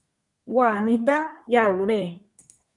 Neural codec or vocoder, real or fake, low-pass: codec, 24 kHz, 1 kbps, SNAC; fake; 10.8 kHz